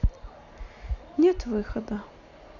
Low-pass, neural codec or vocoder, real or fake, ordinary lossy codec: 7.2 kHz; none; real; none